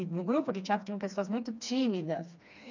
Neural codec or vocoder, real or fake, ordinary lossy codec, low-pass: codec, 16 kHz, 2 kbps, FreqCodec, smaller model; fake; none; 7.2 kHz